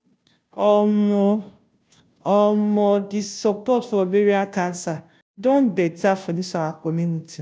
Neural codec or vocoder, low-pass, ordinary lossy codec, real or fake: codec, 16 kHz, 0.5 kbps, FunCodec, trained on Chinese and English, 25 frames a second; none; none; fake